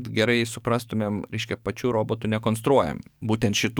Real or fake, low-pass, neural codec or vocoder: fake; 19.8 kHz; codec, 44.1 kHz, 7.8 kbps, DAC